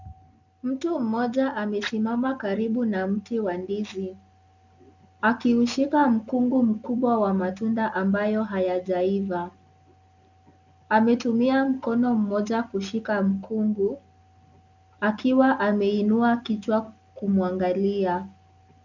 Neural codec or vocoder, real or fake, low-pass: none; real; 7.2 kHz